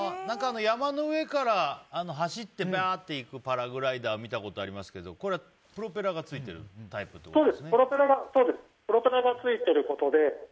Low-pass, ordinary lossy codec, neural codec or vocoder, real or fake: none; none; none; real